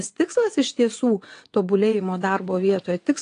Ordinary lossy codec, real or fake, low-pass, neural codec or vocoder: AAC, 48 kbps; fake; 9.9 kHz; vocoder, 22.05 kHz, 80 mel bands, WaveNeXt